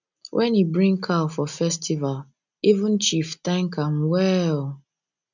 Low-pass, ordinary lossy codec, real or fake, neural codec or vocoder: 7.2 kHz; none; real; none